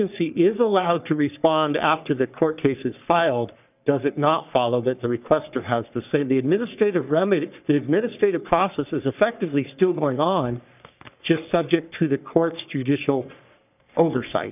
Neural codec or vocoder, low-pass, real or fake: codec, 44.1 kHz, 3.4 kbps, Pupu-Codec; 3.6 kHz; fake